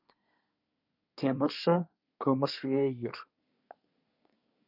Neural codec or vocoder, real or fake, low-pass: codec, 24 kHz, 1 kbps, SNAC; fake; 5.4 kHz